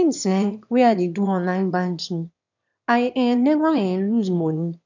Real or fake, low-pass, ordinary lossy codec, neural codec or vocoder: fake; 7.2 kHz; none; autoencoder, 22.05 kHz, a latent of 192 numbers a frame, VITS, trained on one speaker